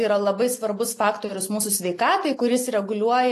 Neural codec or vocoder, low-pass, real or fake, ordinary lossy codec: none; 14.4 kHz; real; AAC, 48 kbps